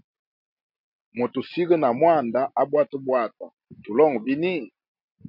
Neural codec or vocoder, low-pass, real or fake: none; 5.4 kHz; real